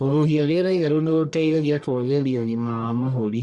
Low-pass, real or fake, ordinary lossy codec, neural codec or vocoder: 10.8 kHz; fake; Opus, 64 kbps; codec, 44.1 kHz, 1.7 kbps, Pupu-Codec